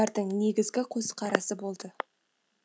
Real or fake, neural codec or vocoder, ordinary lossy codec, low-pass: real; none; none; none